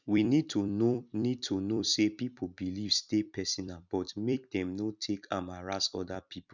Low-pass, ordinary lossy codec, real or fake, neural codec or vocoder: 7.2 kHz; none; fake; vocoder, 44.1 kHz, 128 mel bands every 256 samples, BigVGAN v2